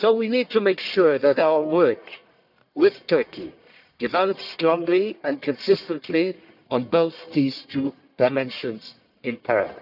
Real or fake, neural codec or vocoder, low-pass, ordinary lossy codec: fake; codec, 44.1 kHz, 1.7 kbps, Pupu-Codec; 5.4 kHz; none